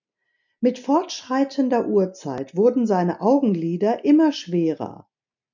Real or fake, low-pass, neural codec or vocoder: real; 7.2 kHz; none